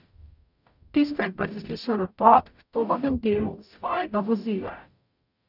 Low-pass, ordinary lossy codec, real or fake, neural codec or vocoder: 5.4 kHz; none; fake; codec, 44.1 kHz, 0.9 kbps, DAC